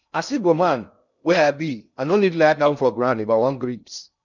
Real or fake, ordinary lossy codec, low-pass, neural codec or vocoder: fake; none; 7.2 kHz; codec, 16 kHz in and 24 kHz out, 0.6 kbps, FocalCodec, streaming, 2048 codes